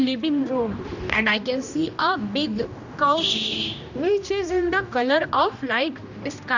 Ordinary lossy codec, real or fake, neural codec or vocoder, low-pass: none; fake; codec, 16 kHz, 1 kbps, X-Codec, HuBERT features, trained on general audio; 7.2 kHz